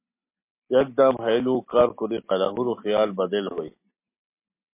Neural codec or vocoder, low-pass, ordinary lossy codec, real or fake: none; 3.6 kHz; MP3, 24 kbps; real